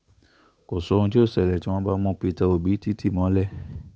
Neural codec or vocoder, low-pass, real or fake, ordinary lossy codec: codec, 16 kHz, 8 kbps, FunCodec, trained on Chinese and English, 25 frames a second; none; fake; none